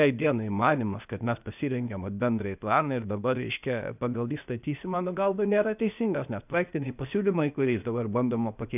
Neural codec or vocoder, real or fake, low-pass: codec, 16 kHz, 0.8 kbps, ZipCodec; fake; 3.6 kHz